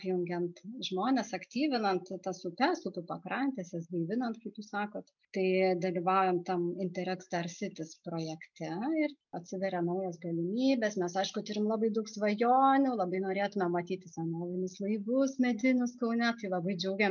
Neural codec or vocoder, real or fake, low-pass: none; real; 7.2 kHz